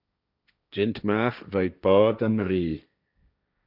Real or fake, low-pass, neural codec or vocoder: fake; 5.4 kHz; codec, 16 kHz, 1.1 kbps, Voila-Tokenizer